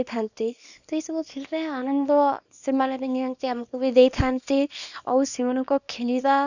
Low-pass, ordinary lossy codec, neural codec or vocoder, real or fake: 7.2 kHz; none; codec, 24 kHz, 0.9 kbps, WavTokenizer, small release; fake